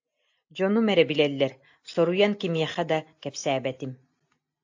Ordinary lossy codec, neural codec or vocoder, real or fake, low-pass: AAC, 48 kbps; none; real; 7.2 kHz